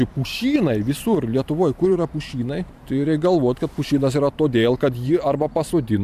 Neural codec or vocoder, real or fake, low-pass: vocoder, 44.1 kHz, 128 mel bands every 512 samples, BigVGAN v2; fake; 14.4 kHz